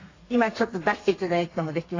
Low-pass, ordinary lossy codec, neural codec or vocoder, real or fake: 7.2 kHz; AAC, 32 kbps; codec, 32 kHz, 1.9 kbps, SNAC; fake